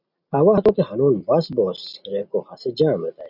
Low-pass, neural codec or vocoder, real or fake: 5.4 kHz; none; real